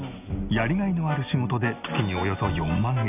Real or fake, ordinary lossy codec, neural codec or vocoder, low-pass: real; none; none; 3.6 kHz